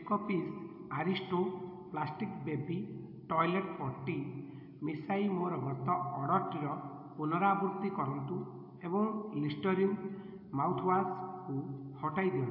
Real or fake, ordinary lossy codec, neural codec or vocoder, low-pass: real; AAC, 48 kbps; none; 5.4 kHz